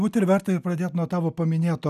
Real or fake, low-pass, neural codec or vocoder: real; 14.4 kHz; none